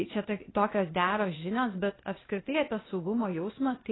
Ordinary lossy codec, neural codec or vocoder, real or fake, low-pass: AAC, 16 kbps; codec, 16 kHz, 0.8 kbps, ZipCodec; fake; 7.2 kHz